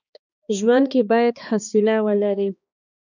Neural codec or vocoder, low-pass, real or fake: codec, 16 kHz, 2 kbps, X-Codec, HuBERT features, trained on balanced general audio; 7.2 kHz; fake